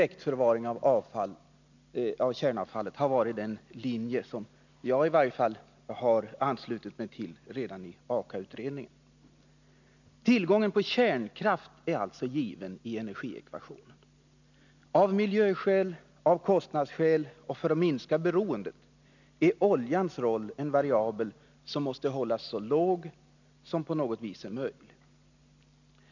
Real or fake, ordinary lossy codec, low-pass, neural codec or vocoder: real; none; 7.2 kHz; none